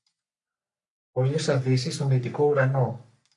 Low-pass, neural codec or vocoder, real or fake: 10.8 kHz; codec, 44.1 kHz, 3.4 kbps, Pupu-Codec; fake